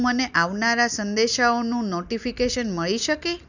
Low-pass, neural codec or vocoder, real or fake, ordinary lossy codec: 7.2 kHz; none; real; none